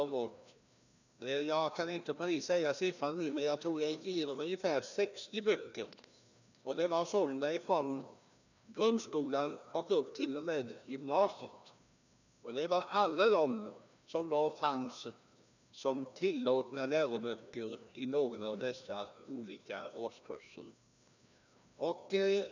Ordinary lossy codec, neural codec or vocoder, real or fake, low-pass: none; codec, 16 kHz, 1 kbps, FreqCodec, larger model; fake; 7.2 kHz